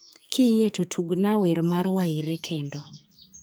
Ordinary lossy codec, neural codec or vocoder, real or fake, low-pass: none; codec, 44.1 kHz, 2.6 kbps, SNAC; fake; none